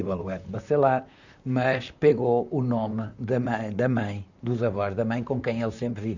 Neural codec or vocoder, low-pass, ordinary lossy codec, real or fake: vocoder, 44.1 kHz, 128 mel bands, Pupu-Vocoder; 7.2 kHz; none; fake